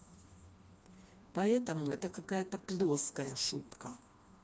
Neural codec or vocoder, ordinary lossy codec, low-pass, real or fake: codec, 16 kHz, 2 kbps, FreqCodec, smaller model; none; none; fake